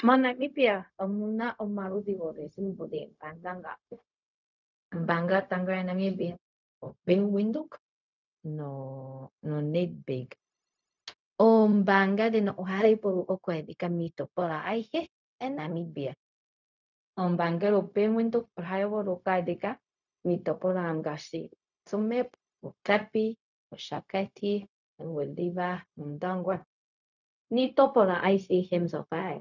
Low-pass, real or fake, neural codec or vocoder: 7.2 kHz; fake; codec, 16 kHz, 0.4 kbps, LongCat-Audio-Codec